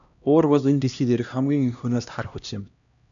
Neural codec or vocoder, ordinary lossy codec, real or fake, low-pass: codec, 16 kHz, 1 kbps, X-Codec, HuBERT features, trained on LibriSpeech; MP3, 96 kbps; fake; 7.2 kHz